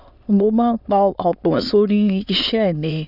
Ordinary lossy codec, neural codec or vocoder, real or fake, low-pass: none; autoencoder, 22.05 kHz, a latent of 192 numbers a frame, VITS, trained on many speakers; fake; 5.4 kHz